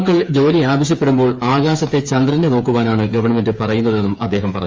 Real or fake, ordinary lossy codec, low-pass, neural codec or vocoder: fake; Opus, 32 kbps; 7.2 kHz; codec, 16 kHz, 8 kbps, FreqCodec, smaller model